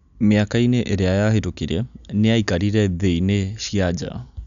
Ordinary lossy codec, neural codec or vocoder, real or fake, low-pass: none; none; real; 7.2 kHz